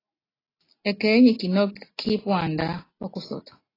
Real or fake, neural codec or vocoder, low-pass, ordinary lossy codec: real; none; 5.4 kHz; AAC, 24 kbps